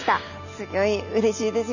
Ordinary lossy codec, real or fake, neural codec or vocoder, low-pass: none; real; none; 7.2 kHz